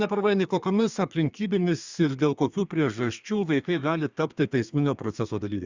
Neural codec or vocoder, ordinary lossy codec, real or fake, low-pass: codec, 32 kHz, 1.9 kbps, SNAC; Opus, 64 kbps; fake; 7.2 kHz